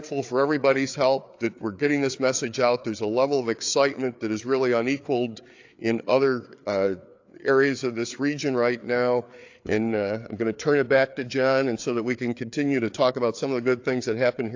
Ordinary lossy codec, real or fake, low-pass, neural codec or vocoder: AAC, 48 kbps; fake; 7.2 kHz; codec, 16 kHz, 4 kbps, FreqCodec, larger model